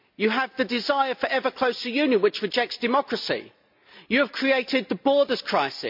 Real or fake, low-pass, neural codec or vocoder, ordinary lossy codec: real; 5.4 kHz; none; MP3, 48 kbps